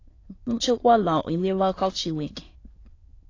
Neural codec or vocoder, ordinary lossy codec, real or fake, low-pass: autoencoder, 22.05 kHz, a latent of 192 numbers a frame, VITS, trained on many speakers; AAC, 32 kbps; fake; 7.2 kHz